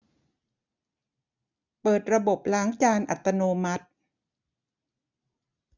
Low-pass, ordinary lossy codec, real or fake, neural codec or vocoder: 7.2 kHz; none; real; none